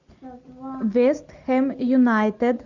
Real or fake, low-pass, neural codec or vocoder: real; 7.2 kHz; none